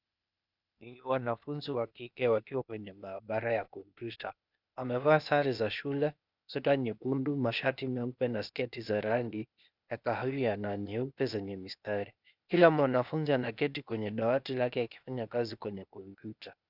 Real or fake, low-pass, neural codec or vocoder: fake; 5.4 kHz; codec, 16 kHz, 0.8 kbps, ZipCodec